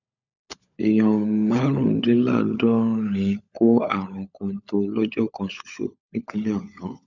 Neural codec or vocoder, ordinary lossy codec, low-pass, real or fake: codec, 16 kHz, 16 kbps, FunCodec, trained on LibriTTS, 50 frames a second; none; 7.2 kHz; fake